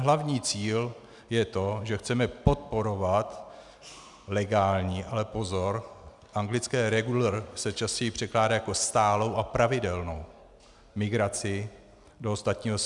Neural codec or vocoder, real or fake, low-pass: none; real; 10.8 kHz